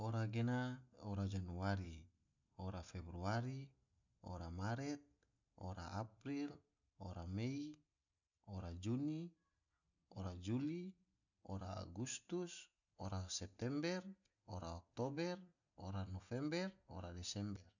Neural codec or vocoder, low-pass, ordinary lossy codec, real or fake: none; 7.2 kHz; none; real